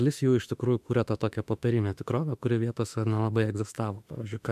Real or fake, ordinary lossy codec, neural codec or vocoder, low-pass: fake; MP3, 96 kbps; autoencoder, 48 kHz, 32 numbers a frame, DAC-VAE, trained on Japanese speech; 14.4 kHz